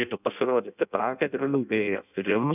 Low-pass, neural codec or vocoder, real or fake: 3.6 kHz; codec, 16 kHz in and 24 kHz out, 0.6 kbps, FireRedTTS-2 codec; fake